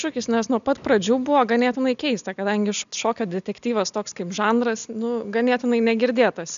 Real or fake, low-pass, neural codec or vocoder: real; 7.2 kHz; none